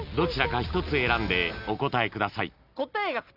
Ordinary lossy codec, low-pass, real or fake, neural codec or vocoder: none; 5.4 kHz; real; none